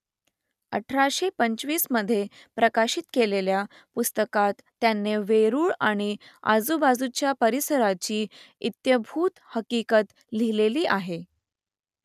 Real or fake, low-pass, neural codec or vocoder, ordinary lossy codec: real; 14.4 kHz; none; none